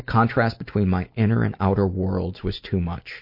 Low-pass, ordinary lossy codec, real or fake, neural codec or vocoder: 5.4 kHz; MP3, 32 kbps; real; none